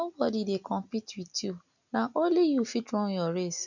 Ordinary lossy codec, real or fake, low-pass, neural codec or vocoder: none; real; 7.2 kHz; none